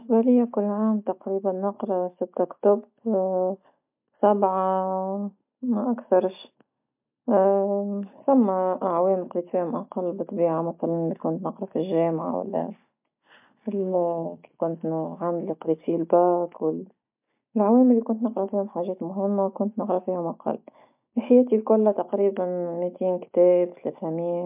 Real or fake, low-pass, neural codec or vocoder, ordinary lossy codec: real; 3.6 kHz; none; MP3, 32 kbps